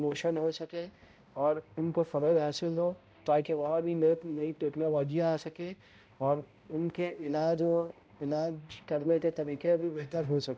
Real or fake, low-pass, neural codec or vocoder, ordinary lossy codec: fake; none; codec, 16 kHz, 0.5 kbps, X-Codec, HuBERT features, trained on balanced general audio; none